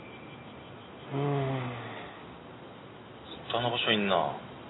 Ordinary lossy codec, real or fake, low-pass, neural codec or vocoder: AAC, 16 kbps; real; 7.2 kHz; none